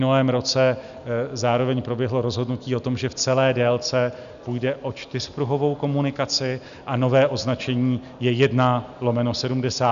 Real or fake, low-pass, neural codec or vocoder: real; 7.2 kHz; none